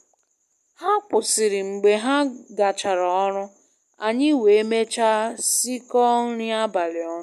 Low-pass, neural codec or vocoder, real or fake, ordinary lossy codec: 14.4 kHz; none; real; none